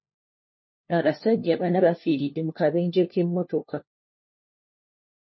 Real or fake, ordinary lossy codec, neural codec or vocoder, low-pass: fake; MP3, 24 kbps; codec, 16 kHz, 1 kbps, FunCodec, trained on LibriTTS, 50 frames a second; 7.2 kHz